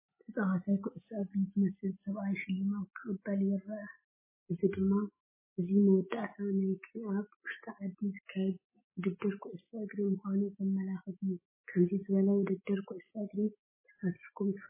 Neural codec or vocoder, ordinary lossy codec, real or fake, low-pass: none; MP3, 16 kbps; real; 3.6 kHz